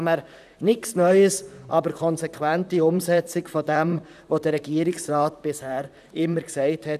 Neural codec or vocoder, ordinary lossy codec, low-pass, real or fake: vocoder, 44.1 kHz, 128 mel bands, Pupu-Vocoder; none; 14.4 kHz; fake